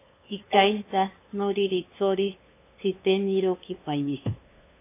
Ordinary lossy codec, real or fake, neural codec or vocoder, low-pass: AAC, 24 kbps; fake; codec, 16 kHz, 2 kbps, FunCodec, trained on LibriTTS, 25 frames a second; 3.6 kHz